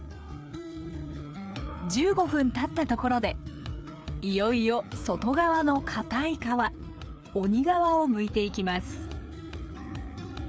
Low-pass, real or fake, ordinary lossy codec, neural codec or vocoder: none; fake; none; codec, 16 kHz, 4 kbps, FreqCodec, larger model